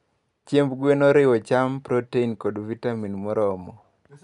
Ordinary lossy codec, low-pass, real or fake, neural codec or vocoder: none; 10.8 kHz; real; none